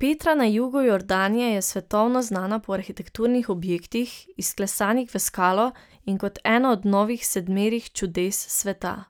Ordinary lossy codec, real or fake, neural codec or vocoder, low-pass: none; real; none; none